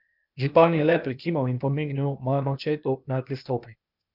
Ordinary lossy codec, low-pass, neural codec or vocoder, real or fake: none; 5.4 kHz; codec, 16 kHz, 0.8 kbps, ZipCodec; fake